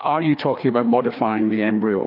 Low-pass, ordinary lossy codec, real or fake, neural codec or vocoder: 5.4 kHz; AAC, 32 kbps; fake; codec, 16 kHz, 2 kbps, FreqCodec, larger model